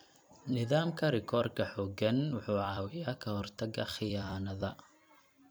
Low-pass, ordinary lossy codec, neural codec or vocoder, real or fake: none; none; vocoder, 44.1 kHz, 128 mel bands every 256 samples, BigVGAN v2; fake